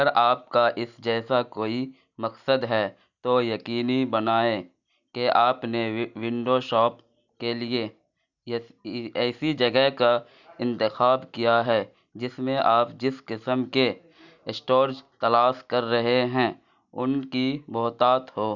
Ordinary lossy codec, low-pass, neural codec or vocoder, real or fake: none; 7.2 kHz; none; real